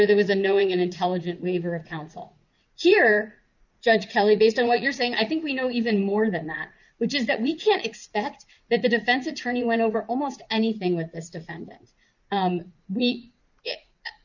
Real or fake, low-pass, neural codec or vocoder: fake; 7.2 kHz; vocoder, 44.1 kHz, 80 mel bands, Vocos